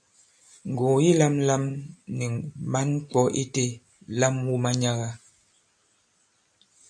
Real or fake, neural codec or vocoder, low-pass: real; none; 9.9 kHz